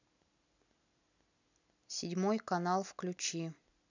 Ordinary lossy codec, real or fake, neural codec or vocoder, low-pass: none; real; none; 7.2 kHz